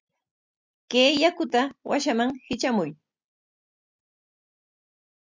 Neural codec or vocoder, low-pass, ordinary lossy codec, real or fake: none; 7.2 kHz; MP3, 64 kbps; real